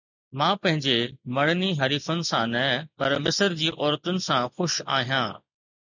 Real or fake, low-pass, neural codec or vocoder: real; 7.2 kHz; none